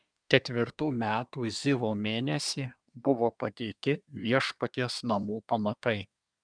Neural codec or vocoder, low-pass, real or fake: codec, 24 kHz, 1 kbps, SNAC; 9.9 kHz; fake